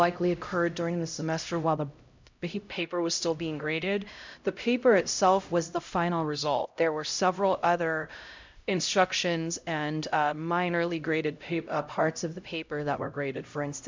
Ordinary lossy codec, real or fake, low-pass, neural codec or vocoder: MP3, 64 kbps; fake; 7.2 kHz; codec, 16 kHz, 0.5 kbps, X-Codec, HuBERT features, trained on LibriSpeech